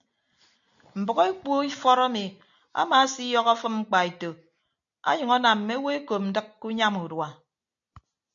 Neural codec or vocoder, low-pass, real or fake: none; 7.2 kHz; real